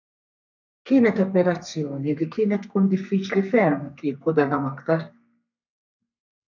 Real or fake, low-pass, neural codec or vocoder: fake; 7.2 kHz; codec, 32 kHz, 1.9 kbps, SNAC